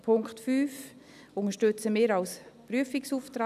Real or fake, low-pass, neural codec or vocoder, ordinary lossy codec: real; 14.4 kHz; none; none